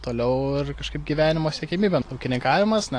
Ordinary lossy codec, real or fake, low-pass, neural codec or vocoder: AAC, 48 kbps; real; 9.9 kHz; none